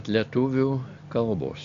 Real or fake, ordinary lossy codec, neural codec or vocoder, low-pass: real; AAC, 64 kbps; none; 7.2 kHz